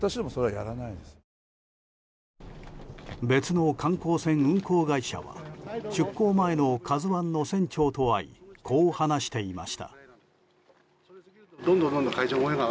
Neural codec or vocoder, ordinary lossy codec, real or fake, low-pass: none; none; real; none